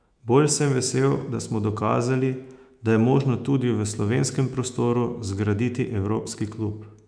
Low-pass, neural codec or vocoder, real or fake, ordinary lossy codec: 9.9 kHz; autoencoder, 48 kHz, 128 numbers a frame, DAC-VAE, trained on Japanese speech; fake; none